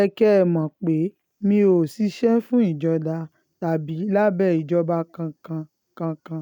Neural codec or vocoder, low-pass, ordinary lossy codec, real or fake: none; 19.8 kHz; none; real